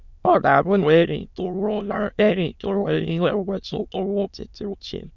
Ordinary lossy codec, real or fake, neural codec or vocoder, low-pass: none; fake; autoencoder, 22.05 kHz, a latent of 192 numbers a frame, VITS, trained on many speakers; 7.2 kHz